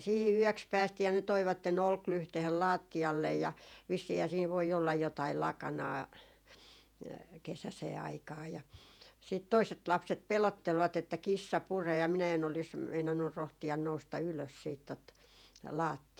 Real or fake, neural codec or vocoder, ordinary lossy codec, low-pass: fake; vocoder, 48 kHz, 128 mel bands, Vocos; none; 19.8 kHz